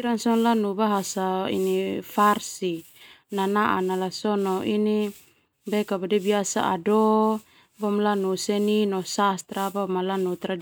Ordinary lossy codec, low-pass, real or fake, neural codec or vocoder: none; none; real; none